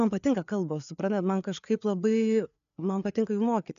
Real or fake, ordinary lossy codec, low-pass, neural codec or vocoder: fake; MP3, 96 kbps; 7.2 kHz; codec, 16 kHz, 4 kbps, FreqCodec, larger model